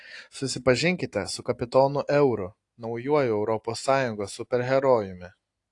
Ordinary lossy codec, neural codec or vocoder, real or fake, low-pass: AAC, 48 kbps; none; real; 10.8 kHz